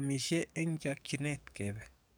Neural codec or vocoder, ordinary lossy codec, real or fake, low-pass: codec, 44.1 kHz, 7.8 kbps, DAC; none; fake; none